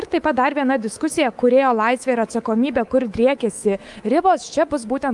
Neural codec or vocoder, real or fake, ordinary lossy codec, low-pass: codec, 24 kHz, 3.1 kbps, DualCodec; fake; Opus, 32 kbps; 10.8 kHz